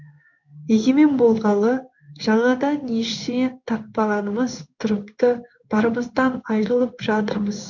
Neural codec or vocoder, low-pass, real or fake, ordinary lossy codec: codec, 16 kHz in and 24 kHz out, 1 kbps, XY-Tokenizer; 7.2 kHz; fake; none